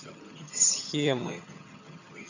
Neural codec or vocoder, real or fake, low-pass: vocoder, 22.05 kHz, 80 mel bands, HiFi-GAN; fake; 7.2 kHz